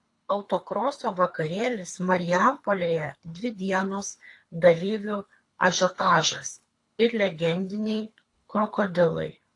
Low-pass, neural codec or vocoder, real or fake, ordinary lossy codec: 10.8 kHz; codec, 24 kHz, 3 kbps, HILCodec; fake; AAC, 48 kbps